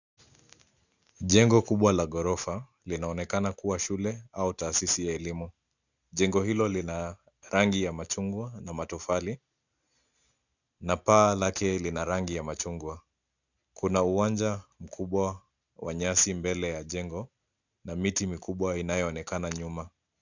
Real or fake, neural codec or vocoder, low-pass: real; none; 7.2 kHz